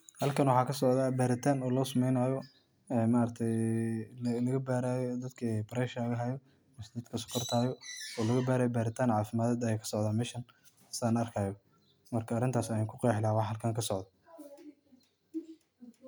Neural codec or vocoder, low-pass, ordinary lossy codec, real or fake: none; none; none; real